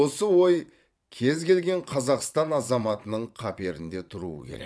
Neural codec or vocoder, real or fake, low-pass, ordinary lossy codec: vocoder, 22.05 kHz, 80 mel bands, WaveNeXt; fake; none; none